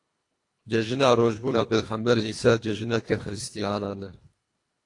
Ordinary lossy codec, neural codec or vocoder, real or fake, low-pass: AAC, 48 kbps; codec, 24 kHz, 1.5 kbps, HILCodec; fake; 10.8 kHz